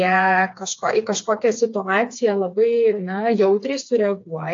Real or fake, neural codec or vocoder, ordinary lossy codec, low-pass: fake; codec, 16 kHz, 4 kbps, FreqCodec, smaller model; AAC, 48 kbps; 7.2 kHz